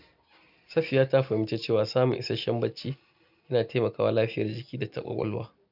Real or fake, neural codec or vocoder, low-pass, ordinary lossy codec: real; none; 5.4 kHz; none